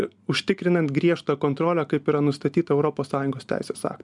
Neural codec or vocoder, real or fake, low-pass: none; real; 10.8 kHz